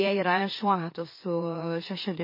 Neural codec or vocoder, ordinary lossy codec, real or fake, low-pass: autoencoder, 44.1 kHz, a latent of 192 numbers a frame, MeloTTS; MP3, 24 kbps; fake; 5.4 kHz